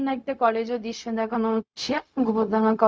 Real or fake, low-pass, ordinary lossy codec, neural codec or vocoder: fake; none; none; codec, 16 kHz, 0.4 kbps, LongCat-Audio-Codec